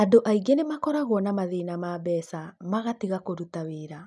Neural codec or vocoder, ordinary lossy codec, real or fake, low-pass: none; none; real; none